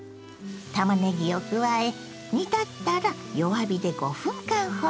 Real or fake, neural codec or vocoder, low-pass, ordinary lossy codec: real; none; none; none